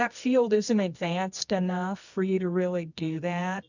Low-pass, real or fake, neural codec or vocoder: 7.2 kHz; fake; codec, 24 kHz, 0.9 kbps, WavTokenizer, medium music audio release